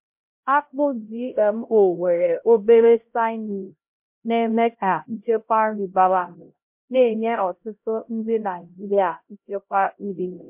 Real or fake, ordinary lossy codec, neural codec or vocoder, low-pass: fake; MP3, 32 kbps; codec, 16 kHz, 0.5 kbps, X-Codec, HuBERT features, trained on LibriSpeech; 3.6 kHz